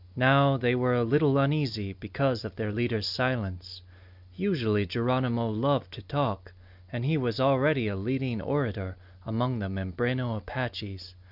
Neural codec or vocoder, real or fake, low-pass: none; real; 5.4 kHz